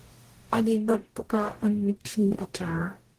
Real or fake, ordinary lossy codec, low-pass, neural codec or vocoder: fake; Opus, 16 kbps; 14.4 kHz; codec, 44.1 kHz, 0.9 kbps, DAC